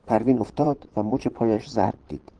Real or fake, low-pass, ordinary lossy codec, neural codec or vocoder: fake; 9.9 kHz; Opus, 16 kbps; vocoder, 22.05 kHz, 80 mel bands, Vocos